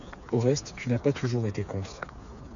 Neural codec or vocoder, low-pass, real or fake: codec, 16 kHz, 4 kbps, FreqCodec, smaller model; 7.2 kHz; fake